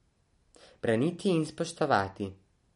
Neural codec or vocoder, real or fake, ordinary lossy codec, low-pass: none; real; MP3, 48 kbps; 19.8 kHz